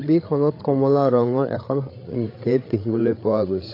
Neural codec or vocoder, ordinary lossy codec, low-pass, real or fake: codec, 16 kHz, 8 kbps, FreqCodec, larger model; AAC, 32 kbps; 5.4 kHz; fake